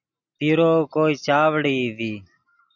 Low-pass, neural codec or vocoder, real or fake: 7.2 kHz; none; real